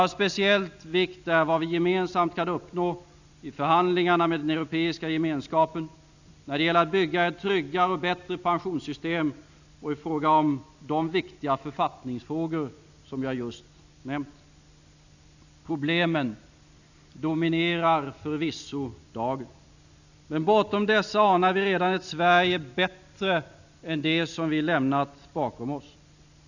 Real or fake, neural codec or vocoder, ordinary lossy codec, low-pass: real; none; none; 7.2 kHz